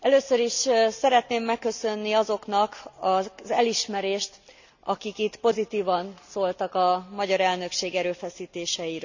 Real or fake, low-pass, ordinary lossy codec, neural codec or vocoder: real; 7.2 kHz; none; none